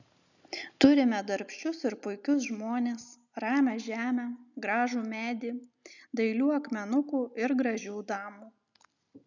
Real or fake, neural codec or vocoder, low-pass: real; none; 7.2 kHz